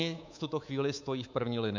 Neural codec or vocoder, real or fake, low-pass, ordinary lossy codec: codec, 24 kHz, 3.1 kbps, DualCodec; fake; 7.2 kHz; MP3, 48 kbps